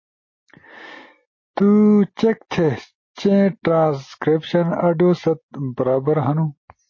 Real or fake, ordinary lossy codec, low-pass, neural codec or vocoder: real; MP3, 32 kbps; 7.2 kHz; none